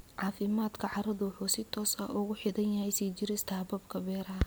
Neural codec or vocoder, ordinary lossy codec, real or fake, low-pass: none; none; real; none